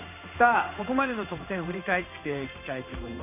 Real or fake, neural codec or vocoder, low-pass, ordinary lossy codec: fake; codec, 16 kHz in and 24 kHz out, 1 kbps, XY-Tokenizer; 3.6 kHz; none